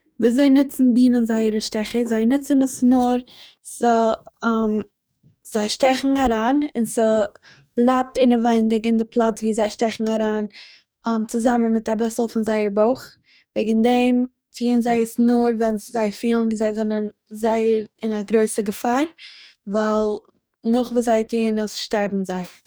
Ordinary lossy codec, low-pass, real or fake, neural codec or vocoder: none; none; fake; codec, 44.1 kHz, 2.6 kbps, DAC